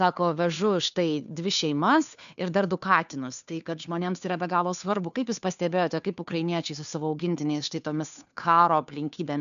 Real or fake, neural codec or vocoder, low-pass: fake; codec, 16 kHz, 2 kbps, FunCodec, trained on Chinese and English, 25 frames a second; 7.2 kHz